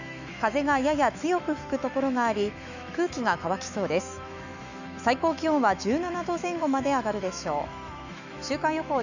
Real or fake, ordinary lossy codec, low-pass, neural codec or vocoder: fake; none; 7.2 kHz; autoencoder, 48 kHz, 128 numbers a frame, DAC-VAE, trained on Japanese speech